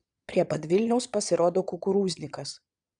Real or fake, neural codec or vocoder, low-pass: fake; vocoder, 22.05 kHz, 80 mel bands, Vocos; 9.9 kHz